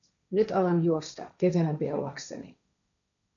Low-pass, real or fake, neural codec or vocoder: 7.2 kHz; fake; codec, 16 kHz, 1.1 kbps, Voila-Tokenizer